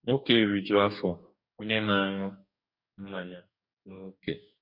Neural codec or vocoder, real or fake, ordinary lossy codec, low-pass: codec, 44.1 kHz, 2.6 kbps, DAC; fake; MP3, 48 kbps; 5.4 kHz